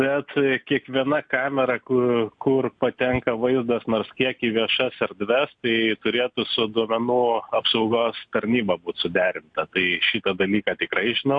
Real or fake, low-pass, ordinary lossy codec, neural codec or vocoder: real; 9.9 kHz; AAC, 64 kbps; none